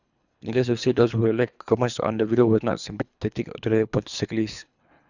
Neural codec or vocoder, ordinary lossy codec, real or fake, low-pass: codec, 24 kHz, 3 kbps, HILCodec; none; fake; 7.2 kHz